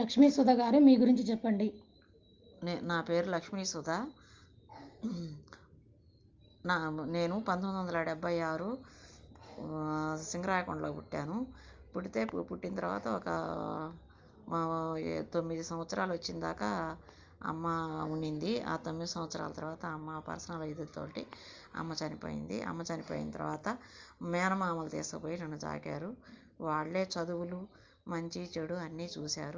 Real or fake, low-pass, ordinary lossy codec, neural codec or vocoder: real; 7.2 kHz; Opus, 32 kbps; none